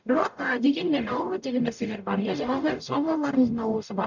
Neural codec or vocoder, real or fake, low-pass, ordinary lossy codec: codec, 44.1 kHz, 0.9 kbps, DAC; fake; 7.2 kHz; none